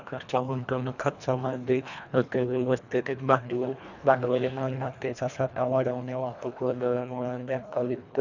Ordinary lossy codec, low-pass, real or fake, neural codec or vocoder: none; 7.2 kHz; fake; codec, 24 kHz, 1.5 kbps, HILCodec